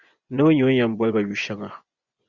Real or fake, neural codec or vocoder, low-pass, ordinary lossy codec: fake; vocoder, 24 kHz, 100 mel bands, Vocos; 7.2 kHz; Opus, 64 kbps